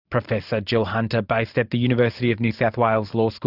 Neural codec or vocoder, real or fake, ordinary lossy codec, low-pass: vocoder, 44.1 kHz, 128 mel bands every 512 samples, BigVGAN v2; fake; Opus, 64 kbps; 5.4 kHz